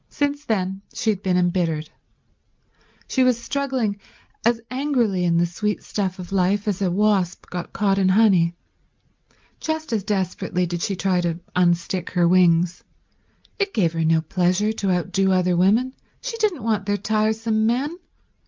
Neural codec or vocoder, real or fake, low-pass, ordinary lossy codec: none; real; 7.2 kHz; Opus, 32 kbps